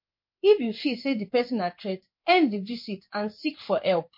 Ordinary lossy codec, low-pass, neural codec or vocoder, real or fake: MP3, 32 kbps; 5.4 kHz; codec, 16 kHz in and 24 kHz out, 1 kbps, XY-Tokenizer; fake